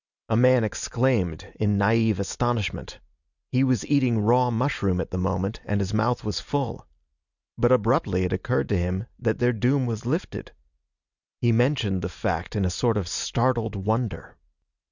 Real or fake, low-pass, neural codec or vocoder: real; 7.2 kHz; none